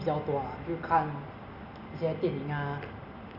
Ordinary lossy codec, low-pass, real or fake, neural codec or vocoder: Opus, 64 kbps; 5.4 kHz; real; none